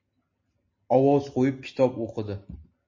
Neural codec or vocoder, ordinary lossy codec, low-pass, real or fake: none; MP3, 32 kbps; 7.2 kHz; real